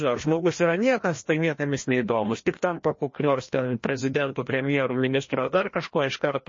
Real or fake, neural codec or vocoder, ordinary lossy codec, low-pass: fake; codec, 16 kHz, 1 kbps, FreqCodec, larger model; MP3, 32 kbps; 7.2 kHz